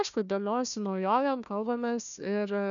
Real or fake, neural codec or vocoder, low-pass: fake; codec, 16 kHz, 1 kbps, FunCodec, trained on Chinese and English, 50 frames a second; 7.2 kHz